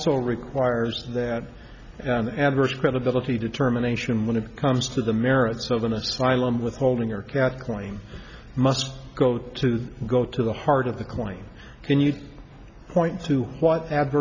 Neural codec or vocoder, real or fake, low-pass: none; real; 7.2 kHz